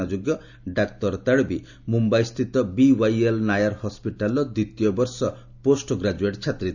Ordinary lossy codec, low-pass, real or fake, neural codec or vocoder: none; 7.2 kHz; real; none